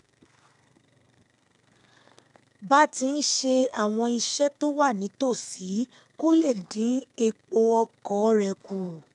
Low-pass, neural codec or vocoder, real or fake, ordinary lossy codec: 10.8 kHz; codec, 32 kHz, 1.9 kbps, SNAC; fake; none